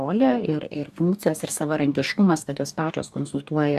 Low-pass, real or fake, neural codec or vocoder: 14.4 kHz; fake; codec, 44.1 kHz, 2.6 kbps, DAC